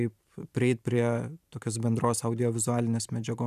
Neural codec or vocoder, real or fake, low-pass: vocoder, 44.1 kHz, 128 mel bands every 512 samples, BigVGAN v2; fake; 14.4 kHz